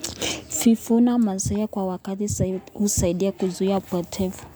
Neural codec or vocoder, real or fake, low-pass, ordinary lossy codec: none; real; none; none